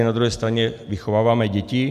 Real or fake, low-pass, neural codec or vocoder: real; 14.4 kHz; none